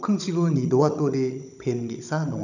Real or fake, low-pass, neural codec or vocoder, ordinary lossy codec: fake; 7.2 kHz; codec, 16 kHz, 16 kbps, FunCodec, trained on Chinese and English, 50 frames a second; none